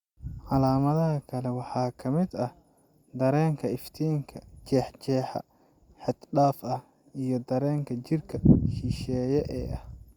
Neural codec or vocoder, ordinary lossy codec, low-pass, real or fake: none; Opus, 64 kbps; 19.8 kHz; real